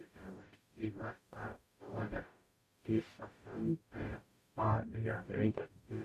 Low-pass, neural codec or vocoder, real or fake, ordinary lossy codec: 14.4 kHz; codec, 44.1 kHz, 0.9 kbps, DAC; fake; MP3, 64 kbps